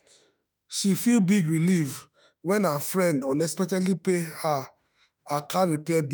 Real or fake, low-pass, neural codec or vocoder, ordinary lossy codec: fake; none; autoencoder, 48 kHz, 32 numbers a frame, DAC-VAE, trained on Japanese speech; none